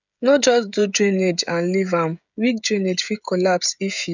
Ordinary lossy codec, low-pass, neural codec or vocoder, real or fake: none; 7.2 kHz; codec, 16 kHz, 16 kbps, FreqCodec, smaller model; fake